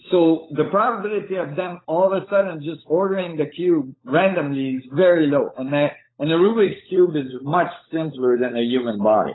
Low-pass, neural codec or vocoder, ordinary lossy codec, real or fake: 7.2 kHz; codec, 16 kHz, 16 kbps, FunCodec, trained on LibriTTS, 50 frames a second; AAC, 16 kbps; fake